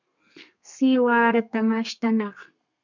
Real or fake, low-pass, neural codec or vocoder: fake; 7.2 kHz; codec, 32 kHz, 1.9 kbps, SNAC